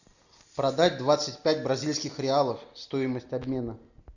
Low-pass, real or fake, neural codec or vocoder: 7.2 kHz; real; none